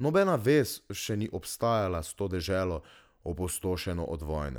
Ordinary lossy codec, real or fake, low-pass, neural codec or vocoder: none; real; none; none